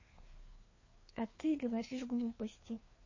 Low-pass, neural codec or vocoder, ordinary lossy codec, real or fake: 7.2 kHz; codec, 16 kHz, 2 kbps, FreqCodec, larger model; MP3, 32 kbps; fake